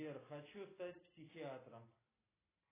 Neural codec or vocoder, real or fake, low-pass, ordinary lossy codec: none; real; 3.6 kHz; AAC, 16 kbps